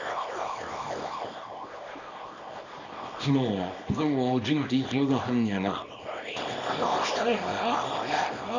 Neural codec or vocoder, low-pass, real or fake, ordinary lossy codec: codec, 24 kHz, 0.9 kbps, WavTokenizer, small release; 7.2 kHz; fake; none